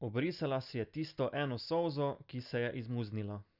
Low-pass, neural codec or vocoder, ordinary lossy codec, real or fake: 5.4 kHz; none; Opus, 64 kbps; real